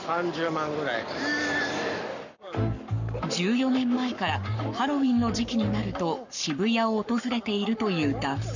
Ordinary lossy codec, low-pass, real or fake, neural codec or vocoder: none; 7.2 kHz; fake; codec, 44.1 kHz, 7.8 kbps, Pupu-Codec